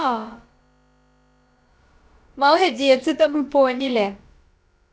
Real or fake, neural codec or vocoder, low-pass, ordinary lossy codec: fake; codec, 16 kHz, about 1 kbps, DyCAST, with the encoder's durations; none; none